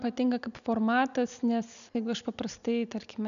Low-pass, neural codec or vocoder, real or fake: 7.2 kHz; none; real